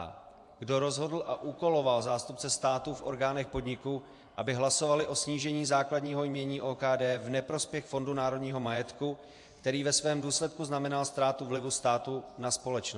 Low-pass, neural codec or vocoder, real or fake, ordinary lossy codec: 10.8 kHz; vocoder, 24 kHz, 100 mel bands, Vocos; fake; AAC, 64 kbps